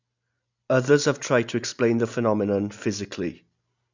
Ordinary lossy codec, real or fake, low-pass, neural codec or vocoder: none; real; 7.2 kHz; none